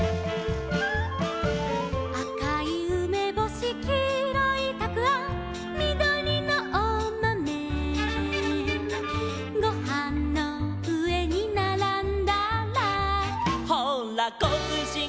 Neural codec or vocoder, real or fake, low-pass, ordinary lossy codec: none; real; none; none